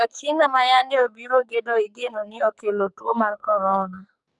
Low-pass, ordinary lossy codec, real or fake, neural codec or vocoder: 10.8 kHz; none; fake; codec, 44.1 kHz, 2.6 kbps, SNAC